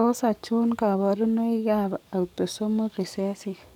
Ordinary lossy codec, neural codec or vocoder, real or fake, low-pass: none; codec, 44.1 kHz, 7.8 kbps, DAC; fake; 19.8 kHz